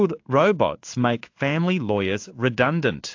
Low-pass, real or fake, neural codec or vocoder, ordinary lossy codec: 7.2 kHz; fake; codec, 16 kHz in and 24 kHz out, 1 kbps, XY-Tokenizer; AAC, 48 kbps